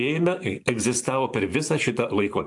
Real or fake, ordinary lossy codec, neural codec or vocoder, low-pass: fake; AAC, 64 kbps; vocoder, 44.1 kHz, 128 mel bands every 512 samples, BigVGAN v2; 10.8 kHz